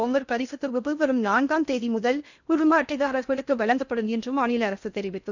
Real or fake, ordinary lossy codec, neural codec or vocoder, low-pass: fake; none; codec, 16 kHz in and 24 kHz out, 0.6 kbps, FocalCodec, streaming, 2048 codes; 7.2 kHz